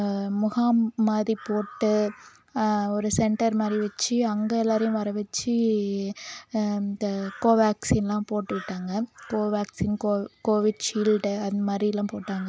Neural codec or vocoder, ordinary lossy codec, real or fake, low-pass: none; none; real; none